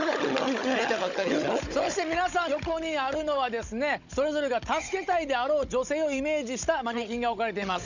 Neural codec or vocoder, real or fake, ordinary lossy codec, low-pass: codec, 16 kHz, 16 kbps, FunCodec, trained on Chinese and English, 50 frames a second; fake; none; 7.2 kHz